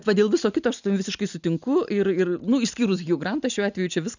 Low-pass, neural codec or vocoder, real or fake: 7.2 kHz; none; real